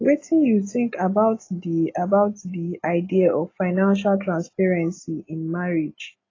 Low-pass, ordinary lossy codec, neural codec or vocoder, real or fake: 7.2 kHz; AAC, 32 kbps; none; real